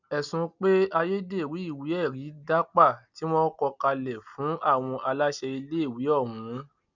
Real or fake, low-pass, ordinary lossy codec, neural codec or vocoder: real; 7.2 kHz; none; none